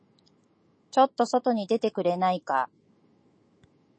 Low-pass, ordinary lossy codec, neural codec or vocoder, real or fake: 9.9 kHz; MP3, 32 kbps; autoencoder, 48 kHz, 128 numbers a frame, DAC-VAE, trained on Japanese speech; fake